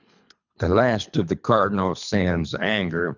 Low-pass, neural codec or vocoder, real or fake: 7.2 kHz; codec, 24 kHz, 3 kbps, HILCodec; fake